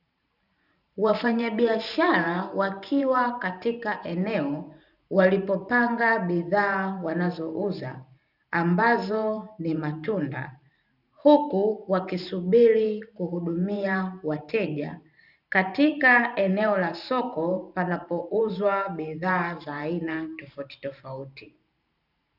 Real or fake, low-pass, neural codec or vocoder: fake; 5.4 kHz; vocoder, 44.1 kHz, 128 mel bands every 512 samples, BigVGAN v2